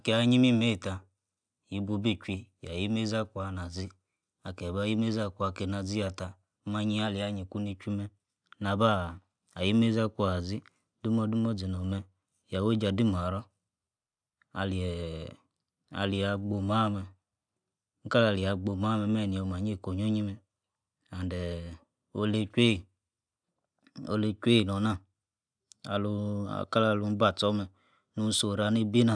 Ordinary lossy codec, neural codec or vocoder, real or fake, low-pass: none; none; real; 9.9 kHz